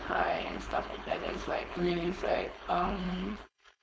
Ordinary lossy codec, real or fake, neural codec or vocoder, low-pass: none; fake; codec, 16 kHz, 4.8 kbps, FACodec; none